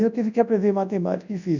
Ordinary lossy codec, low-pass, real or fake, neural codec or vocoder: none; 7.2 kHz; fake; codec, 24 kHz, 0.9 kbps, WavTokenizer, large speech release